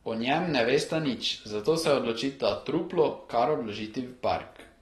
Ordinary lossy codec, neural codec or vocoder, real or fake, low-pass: AAC, 32 kbps; none; real; 19.8 kHz